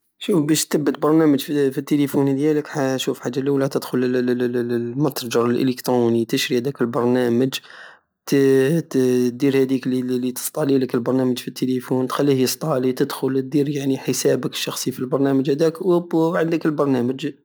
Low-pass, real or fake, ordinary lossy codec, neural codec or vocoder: none; real; none; none